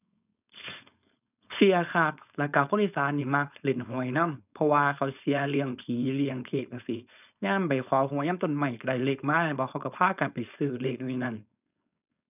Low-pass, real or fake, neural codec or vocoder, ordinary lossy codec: 3.6 kHz; fake; codec, 16 kHz, 4.8 kbps, FACodec; none